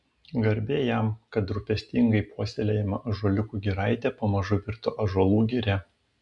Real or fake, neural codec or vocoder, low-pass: real; none; 10.8 kHz